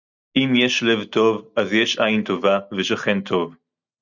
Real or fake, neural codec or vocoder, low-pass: real; none; 7.2 kHz